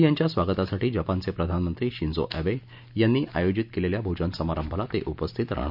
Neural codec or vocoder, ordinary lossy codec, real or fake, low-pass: none; none; real; 5.4 kHz